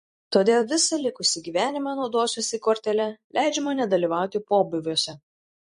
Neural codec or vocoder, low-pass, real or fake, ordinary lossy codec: vocoder, 44.1 kHz, 128 mel bands every 256 samples, BigVGAN v2; 14.4 kHz; fake; MP3, 48 kbps